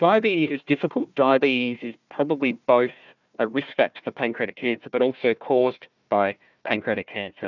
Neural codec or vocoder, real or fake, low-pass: codec, 16 kHz, 1 kbps, FunCodec, trained on Chinese and English, 50 frames a second; fake; 7.2 kHz